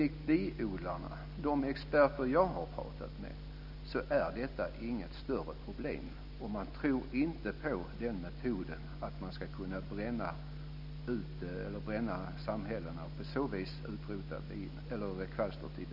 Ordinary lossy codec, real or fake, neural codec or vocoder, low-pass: none; real; none; 5.4 kHz